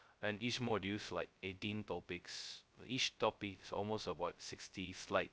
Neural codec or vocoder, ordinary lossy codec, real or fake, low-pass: codec, 16 kHz, 0.2 kbps, FocalCodec; none; fake; none